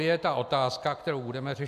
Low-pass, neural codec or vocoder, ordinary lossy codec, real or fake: 14.4 kHz; none; AAC, 96 kbps; real